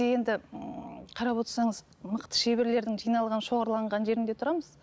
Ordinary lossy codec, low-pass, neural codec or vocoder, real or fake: none; none; none; real